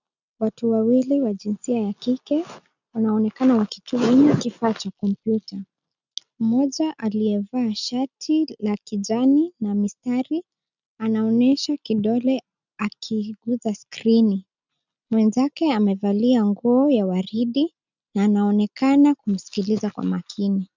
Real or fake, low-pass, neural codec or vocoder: real; 7.2 kHz; none